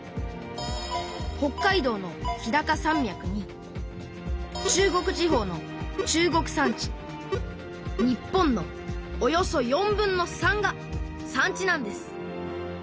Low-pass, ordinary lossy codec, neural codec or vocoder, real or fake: none; none; none; real